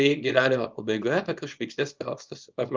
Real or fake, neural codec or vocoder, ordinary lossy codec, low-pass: fake; codec, 24 kHz, 0.9 kbps, WavTokenizer, small release; Opus, 32 kbps; 7.2 kHz